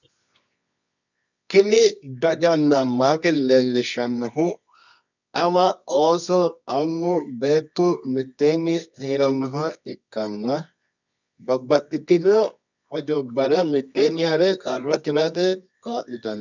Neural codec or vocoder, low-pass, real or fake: codec, 24 kHz, 0.9 kbps, WavTokenizer, medium music audio release; 7.2 kHz; fake